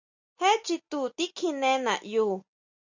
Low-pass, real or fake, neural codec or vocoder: 7.2 kHz; real; none